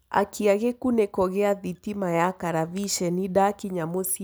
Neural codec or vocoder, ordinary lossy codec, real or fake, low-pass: none; none; real; none